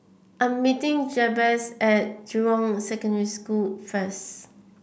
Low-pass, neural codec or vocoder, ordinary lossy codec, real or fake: none; none; none; real